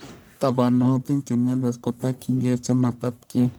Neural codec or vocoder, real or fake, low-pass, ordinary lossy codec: codec, 44.1 kHz, 1.7 kbps, Pupu-Codec; fake; none; none